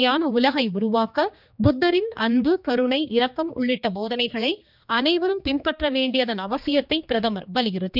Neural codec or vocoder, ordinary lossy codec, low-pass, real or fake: codec, 16 kHz, 2 kbps, X-Codec, HuBERT features, trained on general audio; none; 5.4 kHz; fake